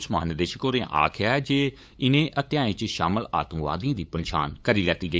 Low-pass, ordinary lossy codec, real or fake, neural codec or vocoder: none; none; fake; codec, 16 kHz, 8 kbps, FunCodec, trained on LibriTTS, 25 frames a second